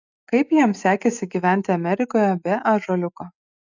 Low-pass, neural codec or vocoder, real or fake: 7.2 kHz; none; real